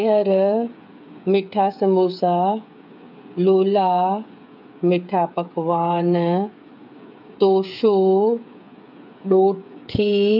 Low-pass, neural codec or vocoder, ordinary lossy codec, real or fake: 5.4 kHz; codec, 16 kHz, 8 kbps, FreqCodec, smaller model; none; fake